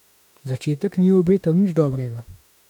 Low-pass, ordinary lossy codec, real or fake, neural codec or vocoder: 19.8 kHz; none; fake; autoencoder, 48 kHz, 32 numbers a frame, DAC-VAE, trained on Japanese speech